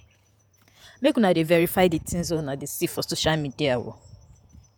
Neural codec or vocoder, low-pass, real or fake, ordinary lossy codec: vocoder, 48 kHz, 128 mel bands, Vocos; none; fake; none